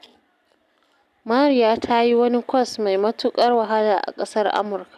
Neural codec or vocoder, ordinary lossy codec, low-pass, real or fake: none; none; 14.4 kHz; real